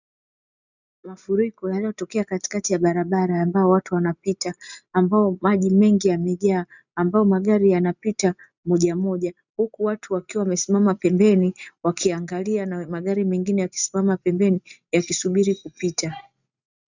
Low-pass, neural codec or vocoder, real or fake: 7.2 kHz; none; real